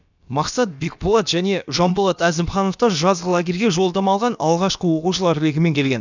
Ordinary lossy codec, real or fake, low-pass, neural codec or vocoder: none; fake; 7.2 kHz; codec, 16 kHz, about 1 kbps, DyCAST, with the encoder's durations